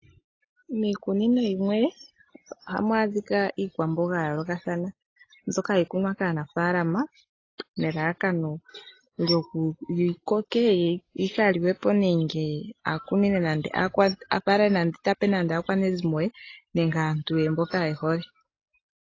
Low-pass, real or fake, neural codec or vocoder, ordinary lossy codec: 7.2 kHz; real; none; AAC, 32 kbps